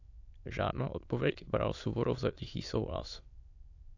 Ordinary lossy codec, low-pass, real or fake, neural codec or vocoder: AAC, 48 kbps; 7.2 kHz; fake; autoencoder, 22.05 kHz, a latent of 192 numbers a frame, VITS, trained on many speakers